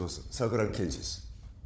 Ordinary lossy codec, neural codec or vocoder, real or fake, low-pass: none; codec, 16 kHz, 16 kbps, FunCodec, trained on Chinese and English, 50 frames a second; fake; none